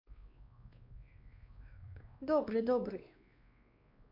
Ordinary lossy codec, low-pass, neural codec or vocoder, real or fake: MP3, 48 kbps; 5.4 kHz; codec, 16 kHz, 2 kbps, X-Codec, WavLM features, trained on Multilingual LibriSpeech; fake